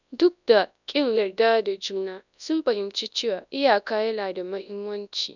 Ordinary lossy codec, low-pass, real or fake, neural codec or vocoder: none; 7.2 kHz; fake; codec, 24 kHz, 0.9 kbps, WavTokenizer, large speech release